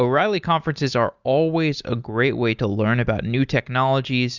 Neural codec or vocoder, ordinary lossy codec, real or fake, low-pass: none; Opus, 64 kbps; real; 7.2 kHz